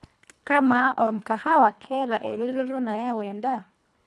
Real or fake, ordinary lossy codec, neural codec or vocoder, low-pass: fake; none; codec, 24 kHz, 1.5 kbps, HILCodec; none